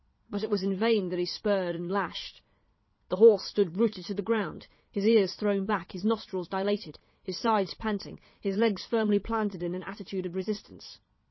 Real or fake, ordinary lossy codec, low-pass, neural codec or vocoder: fake; MP3, 24 kbps; 7.2 kHz; vocoder, 22.05 kHz, 80 mel bands, Vocos